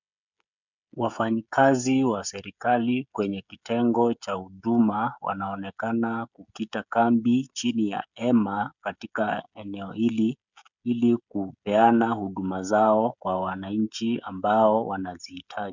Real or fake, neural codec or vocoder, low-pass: fake; codec, 16 kHz, 16 kbps, FreqCodec, smaller model; 7.2 kHz